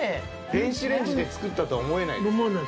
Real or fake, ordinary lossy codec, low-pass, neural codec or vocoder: real; none; none; none